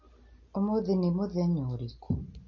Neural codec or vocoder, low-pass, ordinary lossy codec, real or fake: none; 7.2 kHz; MP3, 32 kbps; real